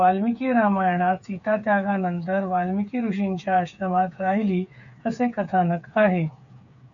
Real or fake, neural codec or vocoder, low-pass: fake; codec, 16 kHz, 8 kbps, FreqCodec, smaller model; 7.2 kHz